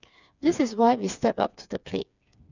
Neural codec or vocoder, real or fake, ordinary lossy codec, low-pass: codec, 16 kHz, 4 kbps, FreqCodec, smaller model; fake; none; 7.2 kHz